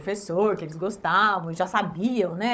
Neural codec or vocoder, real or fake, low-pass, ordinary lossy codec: codec, 16 kHz, 16 kbps, FunCodec, trained on Chinese and English, 50 frames a second; fake; none; none